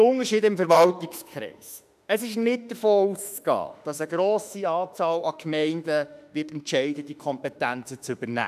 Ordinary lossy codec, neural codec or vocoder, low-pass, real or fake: none; autoencoder, 48 kHz, 32 numbers a frame, DAC-VAE, trained on Japanese speech; 14.4 kHz; fake